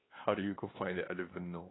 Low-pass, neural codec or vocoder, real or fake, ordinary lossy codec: 7.2 kHz; codec, 16 kHz, 2 kbps, X-Codec, WavLM features, trained on Multilingual LibriSpeech; fake; AAC, 16 kbps